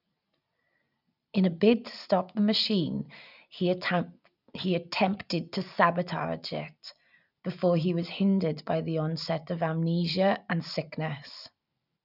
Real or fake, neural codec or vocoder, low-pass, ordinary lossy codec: real; none; 5.4 kHz; none